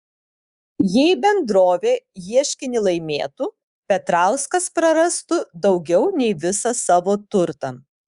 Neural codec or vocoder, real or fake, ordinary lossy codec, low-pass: codec, 24 kHz, 3.1 kbps, DualCodec; fake; Opus, 64 kbps; 10.8 kHz